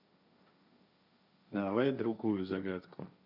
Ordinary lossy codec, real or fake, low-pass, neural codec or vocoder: none; fake; 5.4 kHz; codec, 16 kHz, 1.1 kbps, Voila-Tokenizer